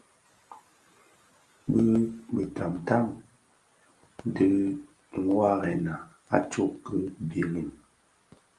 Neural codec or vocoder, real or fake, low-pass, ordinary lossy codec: none; real; 10.8 kHz; Opus, 32 kbps